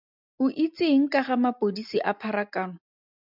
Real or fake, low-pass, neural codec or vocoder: real; 5.4 kHz; none